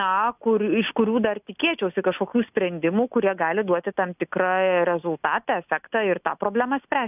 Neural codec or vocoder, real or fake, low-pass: none; real; 3.6 kHz